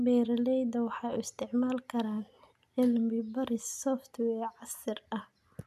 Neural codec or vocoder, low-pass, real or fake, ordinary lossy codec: none; 14.4 kHz; real; none